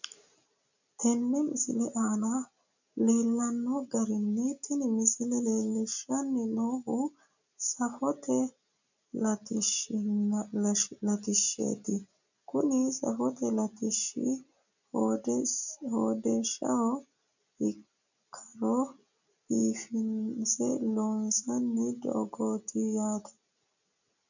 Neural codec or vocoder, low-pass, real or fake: none; 7.2 kHz; real